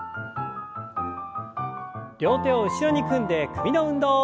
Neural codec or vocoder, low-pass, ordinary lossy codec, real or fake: none; none; none; real